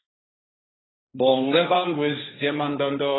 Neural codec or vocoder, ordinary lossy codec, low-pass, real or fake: codec, 16 kHz, 1.1 kbps, Voila-Tokenizer; AAC, 16 kbps; 7.2 kHz; fake